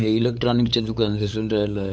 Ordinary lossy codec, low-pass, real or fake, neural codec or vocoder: none; none; fake; codec, 16 kHz, 8 kbps, FunCodec, trained on LibriTTS, 25 frames a second